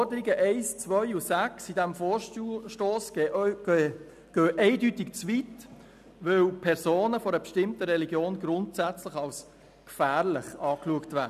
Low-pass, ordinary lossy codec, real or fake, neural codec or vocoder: 14.4 kHz; none; real; none